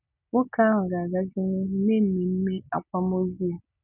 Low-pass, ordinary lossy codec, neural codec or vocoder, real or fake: 3.6 kHz; none; none; real